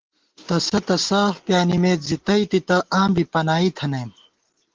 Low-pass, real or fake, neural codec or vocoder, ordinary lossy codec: 7.2 kHz; real; none; Opus, 16 kbps